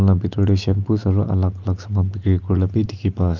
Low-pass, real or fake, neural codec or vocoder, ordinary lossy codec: 7.2 kHz; real; none; Opus, 24 kbps